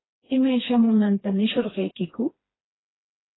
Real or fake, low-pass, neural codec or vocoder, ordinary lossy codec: fake; 7.2 kHz; codec, 16 kHz, 2 kbps, FreqCodec, smaller model; AAC, 16 kbps